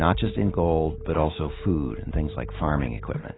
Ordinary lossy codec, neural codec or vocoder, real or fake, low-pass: AAC, 16 kbps; none; real; 7.2 kHz